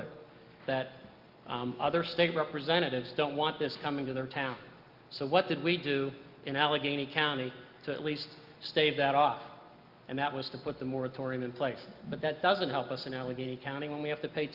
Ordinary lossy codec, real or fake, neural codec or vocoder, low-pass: Opus, 32 kbps; real; none; 5.4 kHz